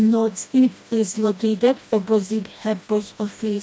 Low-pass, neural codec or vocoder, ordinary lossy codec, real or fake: none; codec, 16 kHz, 1 kbps, FreqCodec, smaller model; none; fake